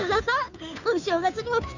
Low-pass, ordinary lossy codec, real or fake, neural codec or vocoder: 7.2 kHz; none; fake; codec, 16 kHz, 2 kbps, FunCodec, trained on Chinese and English, 25 frames a second